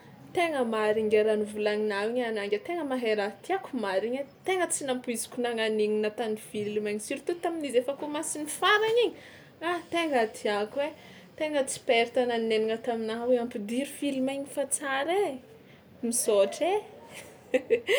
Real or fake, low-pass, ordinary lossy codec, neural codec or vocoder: real; none; none; none